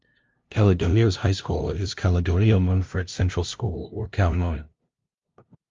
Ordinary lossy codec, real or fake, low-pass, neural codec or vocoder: Opus, 32 kbps; fake; 7.2 kHz; codec, 16 kHz, 0.5 kbps, FunCodec, trained on LibriTTS, 25 frames a second